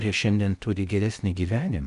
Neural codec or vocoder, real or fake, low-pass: codec, 16 kHz in and 24 kHz out, 0.6 kbps, FocalCodec, streaming, 4096 codes; fake; 10.8 kHz